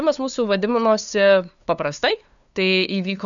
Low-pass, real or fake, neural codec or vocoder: 7.2 kHz; fake; codec, 16 kHz, 2 kbps, FunCodec, trained on LibriTTS, 25 frames a second